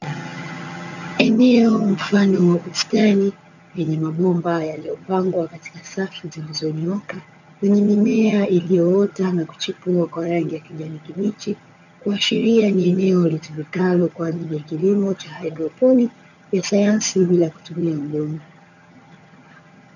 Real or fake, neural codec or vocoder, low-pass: fake; vocoder, 22.05 kHz, 80 mel bands, HiFi-GAN; 7.2 kHz